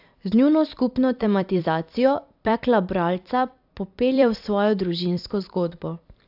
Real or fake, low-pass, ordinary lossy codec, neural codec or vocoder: fake; 5.4 kHz; AAC, 48 kbps; vocoder, 44.1 kHz, 128 mel bands every 256 samples, BigVGAN v2